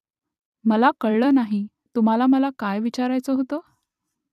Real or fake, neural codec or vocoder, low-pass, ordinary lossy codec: fake; vocoder, 44.1 kHz, 128 mel bands every 256 samples, BigVGAN v2; 14.4 kHz; none